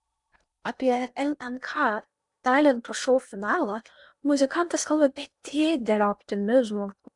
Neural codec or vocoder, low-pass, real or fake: codec, 16 kHz in and 24 kHz out, 0.8 kbps, FocalCodec, streaming, 65536 codes; 10.8 kHz; fake